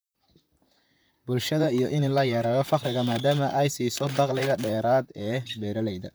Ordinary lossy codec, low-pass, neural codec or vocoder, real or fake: none; none; vocoder, 44.1 kHz, 128 mel bands, Pupu-Vocoder; fake